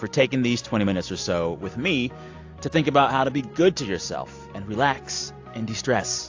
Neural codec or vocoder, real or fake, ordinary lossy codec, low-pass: none; real; AAC, 48 kbps; 7.2 kHz